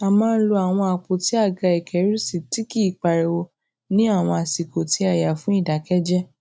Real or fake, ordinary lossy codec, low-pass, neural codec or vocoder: real; none; none; none